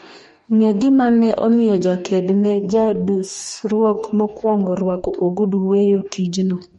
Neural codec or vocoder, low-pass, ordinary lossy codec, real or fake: codec, 44.1 kHz, 2.6 kbps, DAC; 19.8 kHz; MP3, 48 kbps; fake